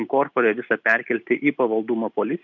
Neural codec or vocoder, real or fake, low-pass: none; real; 7.2 kHz